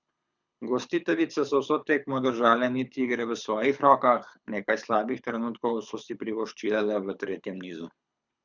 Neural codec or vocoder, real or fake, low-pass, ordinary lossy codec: codec, 24 kHz, 6 kbps, HILCodec; fake; 7.2 kHz; none